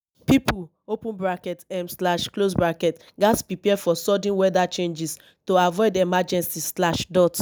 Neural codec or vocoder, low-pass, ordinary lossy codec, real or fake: none; none; none; real